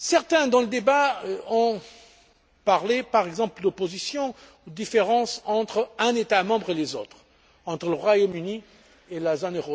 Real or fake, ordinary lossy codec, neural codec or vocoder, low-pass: real; none; none; none